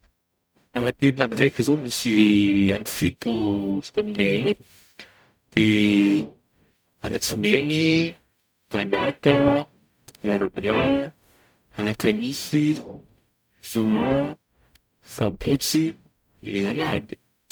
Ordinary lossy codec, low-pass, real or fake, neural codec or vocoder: none; none; fake; codec, 44.1 kHz, 0.9 kbps, DAC